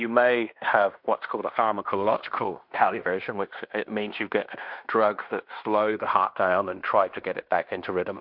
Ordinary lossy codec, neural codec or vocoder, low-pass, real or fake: MP3, 48 kbps; codec, 16 kHz in and 24 kHz out, 0.9 kbps, LongCat-Audio-Codec, fine tuned four codebook decoder; 5.4 kHz; fake